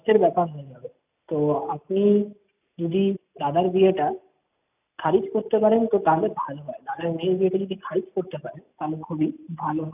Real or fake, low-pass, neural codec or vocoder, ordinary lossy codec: real; 3.6 kHz; none; none